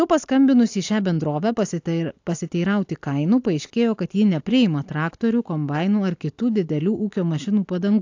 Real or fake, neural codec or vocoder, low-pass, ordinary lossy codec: real; none; 7.2 kHz; AAC, 48 kbps